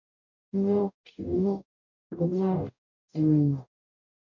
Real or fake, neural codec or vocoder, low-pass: fake; codec, 44.1 kHz, 0.9 kbps, DAC; 7.2 kHz